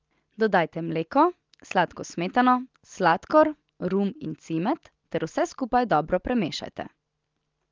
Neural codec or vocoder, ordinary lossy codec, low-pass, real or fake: none; Opus, 24 kbps; 7.2 kHz; real